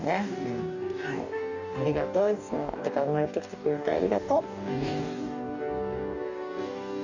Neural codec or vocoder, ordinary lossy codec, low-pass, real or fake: codec, 44.1 kHz, 2.6 kbps, DAC; none; 7.2 kHz; fake